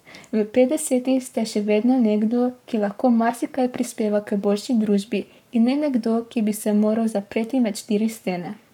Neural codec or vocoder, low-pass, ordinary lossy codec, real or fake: codec, 44.1 kHz, 7.8 kbps, Pupu-Codec; 19.8 kHz; none; fake